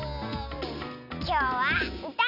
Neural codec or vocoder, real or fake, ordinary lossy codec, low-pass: none; real; none; 5.4 kHz